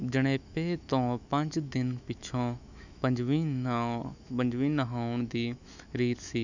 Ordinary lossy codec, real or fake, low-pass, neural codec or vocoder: none; fake; 7.2 kHz; autoencoder, 48 kHz, 128 numbers a frame, DAC-VAE, trained on Japanese speech